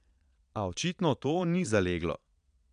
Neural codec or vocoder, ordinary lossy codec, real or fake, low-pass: vocoder, 22.05 kHz, 80 mel bands, Vocos; none; fake; 9.9 kHz